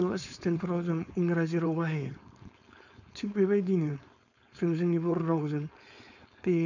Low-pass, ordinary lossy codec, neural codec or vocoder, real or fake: 7.2 kHz; none; codec, 16 kHz, 4.8 kbps, FACodec; fake